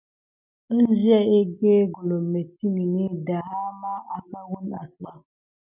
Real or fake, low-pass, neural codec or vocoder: real; 3.6 kHz; none